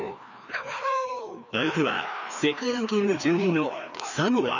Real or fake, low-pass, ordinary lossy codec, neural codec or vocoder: fake; 7.2 kHz; none; codec, 16 kHz, 2 kbps, FreqCodec, larger model